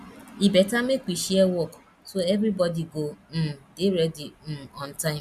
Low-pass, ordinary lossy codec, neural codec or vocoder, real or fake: 14.4 kHz; none; none; real